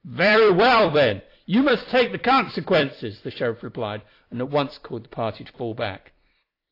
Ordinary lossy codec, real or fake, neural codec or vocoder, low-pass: AAC, 32 kbps; fake; vocoder, 44.1 kHz, 128 mel bands every 256 samples, BigVGAN v2; 5.4 kHz